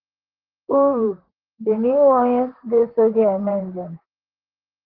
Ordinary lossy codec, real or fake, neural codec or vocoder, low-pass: Opus, 16 kbps; fake; vocoder, 44.1 kHz, 128 mel bands, Pupu-Vocoder; 5.4 kHz